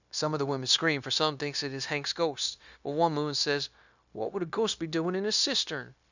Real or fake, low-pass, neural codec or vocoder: fake; 7.2 kHz; codec, 16 kHz, 0.9 kbps, LongCat-Audio-Codec